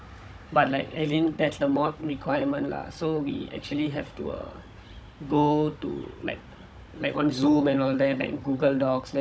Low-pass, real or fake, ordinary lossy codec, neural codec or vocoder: none; fake; none; codec, 16 kHz, 16 kbps, FunCodec, trained on Chinese and English, 50 frames a second